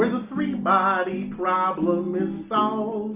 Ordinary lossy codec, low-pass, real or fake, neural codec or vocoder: Opus, 64 kbps; 3.6 kHz; real; none